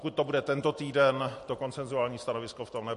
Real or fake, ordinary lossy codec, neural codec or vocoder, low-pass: real; MP3, 48 kbps; none; 14.4 kHz